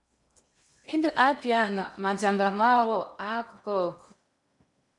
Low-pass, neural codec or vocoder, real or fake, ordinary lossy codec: 10.8 kHz; codec, 16 kHz in and 24 kHz out, 0.8 kbps, FocalCodec, streaming, 65536 codes; fake; MP3, 96 kbps